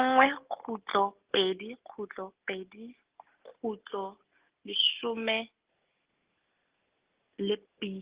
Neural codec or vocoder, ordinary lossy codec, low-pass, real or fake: none; Opus, 16 kbps; 3.6 kHz; real